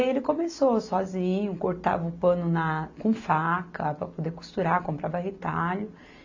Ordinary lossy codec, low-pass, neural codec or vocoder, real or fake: AAC, 48 kbps; 7.2 kHz; none; real